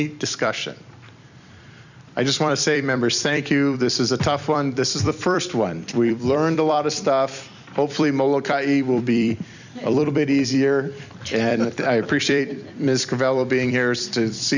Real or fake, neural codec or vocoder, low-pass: fake; vocoder, 44.1 kHz, 128 mel bands every 256 samples, BigVGAN v2; 7.2 kHz